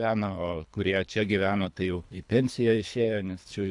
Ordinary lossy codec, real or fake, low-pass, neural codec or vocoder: AAC, 64 kbps; fake; 10.8 kHz; codec, 24 kHz, 3 kbps, HILCodec